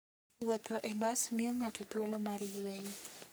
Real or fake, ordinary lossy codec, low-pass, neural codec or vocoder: fake; none; none; codec, 44.1 kHz, 3.4 kbps, Pupu-Codec